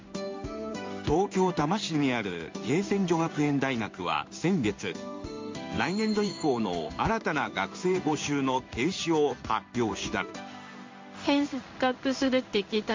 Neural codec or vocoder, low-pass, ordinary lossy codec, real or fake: codec, 16 kHz in and 24 kHz out, 1 kbps, XY-Tokenizer; 7.2 kHz; MP3, 48 kbps; fake